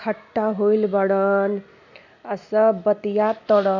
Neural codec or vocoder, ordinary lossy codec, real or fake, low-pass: none; none; real; 7.2 kHz